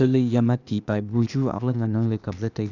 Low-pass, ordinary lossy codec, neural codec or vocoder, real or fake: 7.2 kHz; none; codec, 16 kHz, 0.8 kbps, ZipCodec; fake